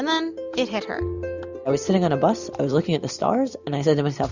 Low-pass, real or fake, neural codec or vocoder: 7.2 kHz; real; none